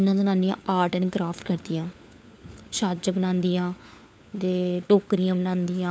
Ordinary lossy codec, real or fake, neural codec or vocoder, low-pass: none; fake; codec, 16 kHz, 4 kbps, FunCodec, trained on LibriTTS, 50 frames a second; none